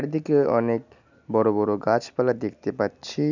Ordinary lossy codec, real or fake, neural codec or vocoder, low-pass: none; real; none; 7.2 kHz